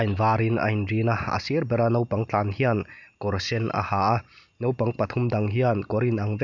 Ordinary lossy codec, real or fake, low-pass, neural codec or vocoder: none; real; 7.2 kHz; none